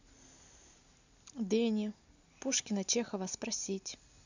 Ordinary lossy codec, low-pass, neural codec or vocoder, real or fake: none; 7.2 kHz; none; real